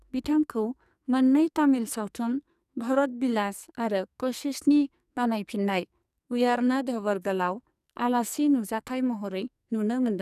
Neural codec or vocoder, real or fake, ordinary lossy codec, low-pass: codec, 44.1 kHz, 2.6 kbps, SNAC; fake; none; 14.4 kHz